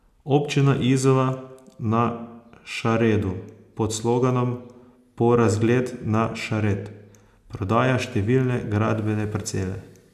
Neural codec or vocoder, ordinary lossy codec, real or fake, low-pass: none; none; real; 14.4 kHz